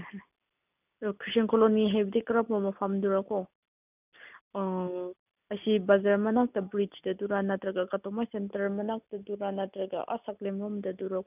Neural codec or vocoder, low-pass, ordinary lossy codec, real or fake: none; 3.6 kHz; none; real